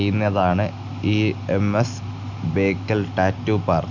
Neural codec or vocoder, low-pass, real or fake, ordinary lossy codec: none; 7.2 kHz; real; none